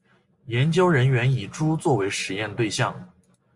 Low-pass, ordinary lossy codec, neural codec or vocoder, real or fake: 10.8 kHz; Opus, 64 kbps; none; real